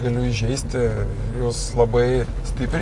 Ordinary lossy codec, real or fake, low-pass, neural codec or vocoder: AAC, 48 kbps; real; 10.8 kHz; none